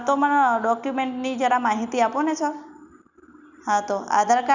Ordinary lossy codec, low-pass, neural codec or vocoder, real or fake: none; 7.2 kHz; none; real